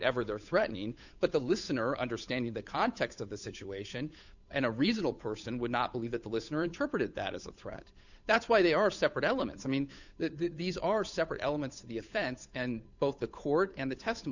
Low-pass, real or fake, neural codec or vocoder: 7.2 kHz; fake; codec, 16 kHz, 8 kbps, FunCodec, trained on Chinese and English, 25 frames a second